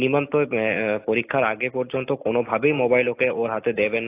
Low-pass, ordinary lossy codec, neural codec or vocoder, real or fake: 3.6 kHz; AAC, 24 kbps; none; real